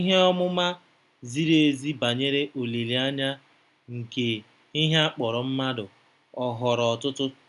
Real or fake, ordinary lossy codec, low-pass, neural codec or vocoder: real; none; 10.8 kHz; none